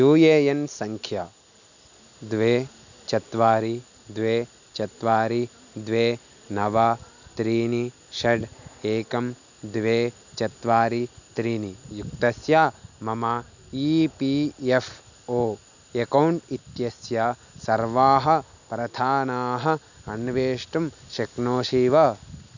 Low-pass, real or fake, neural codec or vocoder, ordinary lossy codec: 7.2 kHz; real; none; none